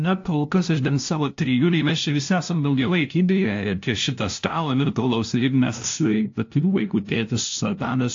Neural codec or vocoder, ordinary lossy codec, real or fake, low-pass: codec, 16 kHz, 0.5 kbps, FunCodec, trained on LibriTTS, 25 frames a second; AAC, 48 kbps; fake; 7.2 kHz